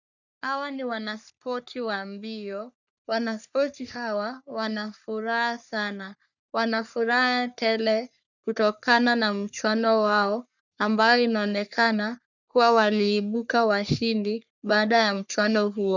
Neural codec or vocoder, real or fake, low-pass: codec, 44.1 kHz, 3.4 kbps, Pupu-Codec; fake; 7.2 kHz